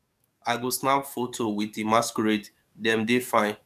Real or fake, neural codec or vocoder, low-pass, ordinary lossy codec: fake; codec, 44.1 kHz, 7.8 kbps, DAC; 14.4 kHz; none